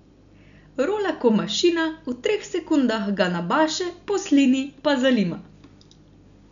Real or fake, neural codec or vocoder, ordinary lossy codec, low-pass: real; none; none; 7.2 kHz